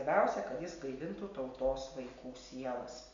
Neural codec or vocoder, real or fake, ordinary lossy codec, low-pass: none; real; MP3, 64 kbps; 7.2 kHz